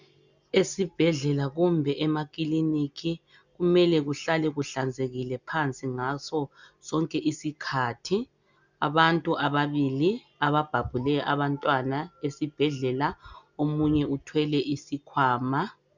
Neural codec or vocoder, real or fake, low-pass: none; real; 7.2 kHz